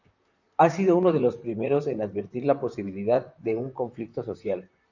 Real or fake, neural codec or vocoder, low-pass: fake; vocoder, 44.1 kHz, 128 mel bands, Pupu-Vocoder; 7.2 kHz